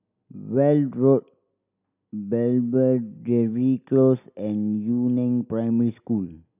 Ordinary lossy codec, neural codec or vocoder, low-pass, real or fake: none; none; 3.6 kHz; real